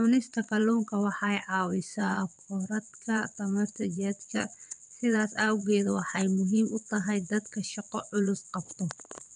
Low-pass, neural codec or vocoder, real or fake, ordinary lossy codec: 9.9 kHz; vocoder, 22.05 kHz, 80 mel bands, WaveNeXt; fake; none